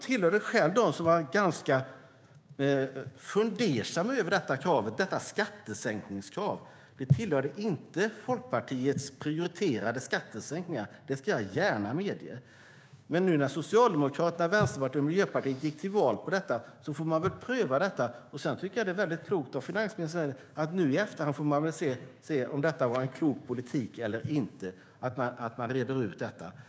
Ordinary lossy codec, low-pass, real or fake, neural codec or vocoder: none; none; fake; codec, 16 kHz, 6 kbps, DAC